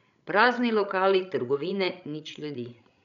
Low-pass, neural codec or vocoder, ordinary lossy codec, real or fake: 7.2 kHz; codec, 16 kHz, 16 kbps, FreqCodec, larger model; none; fake